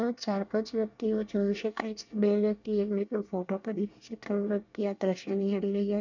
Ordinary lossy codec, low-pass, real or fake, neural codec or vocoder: none; 7.2 kHz; fake; codec, 24 kHz, 1 kbps, SNAC